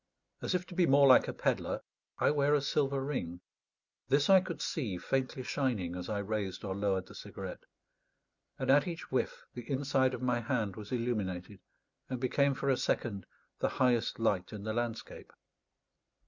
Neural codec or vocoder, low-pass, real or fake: none; 7.2 kHz; real